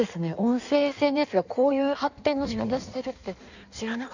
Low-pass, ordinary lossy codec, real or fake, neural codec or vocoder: 7.2 kHz; none; fake; codec, 16 kHz in and 24 kHz out, 1.1 kbps, FireRedTTS-2 codec